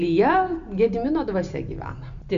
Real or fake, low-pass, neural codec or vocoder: real; 7.2 kHz; none